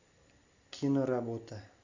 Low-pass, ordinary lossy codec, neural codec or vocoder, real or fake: 7.2 kHz; AAC, 48 kbps; none; real